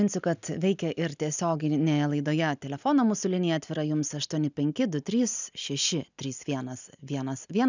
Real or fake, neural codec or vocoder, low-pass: real; none; 7.2 kHz